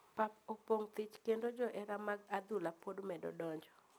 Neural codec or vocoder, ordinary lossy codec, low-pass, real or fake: none; none; none; real